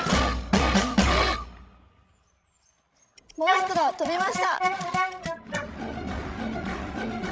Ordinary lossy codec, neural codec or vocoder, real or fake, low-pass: none; codec, 16 kHz, 16 kbps, FreqCodec, larger model; fake; none